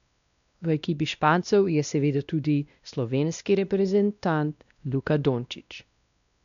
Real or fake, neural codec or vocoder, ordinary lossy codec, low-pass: fake; codec, 16 kHz, 1 kbps, X-Codec, WavLM features, trained on Multilingual LibriSpeech; none; 7.2 kHz